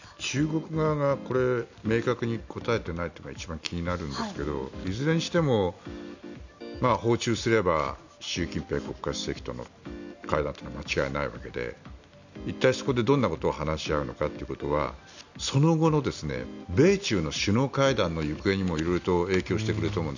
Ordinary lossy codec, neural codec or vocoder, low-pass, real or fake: none; none; 7.2 kHz; real